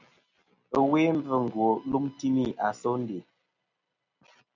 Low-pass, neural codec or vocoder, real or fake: 7.2 kHz; none; real